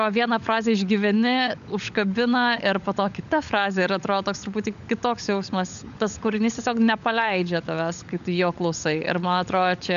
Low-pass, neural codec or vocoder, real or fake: 7.2 kHz; codec, 16 kHz, 16 kbps, FunCodec, trained on LibriTTS, 50 frames a second; fake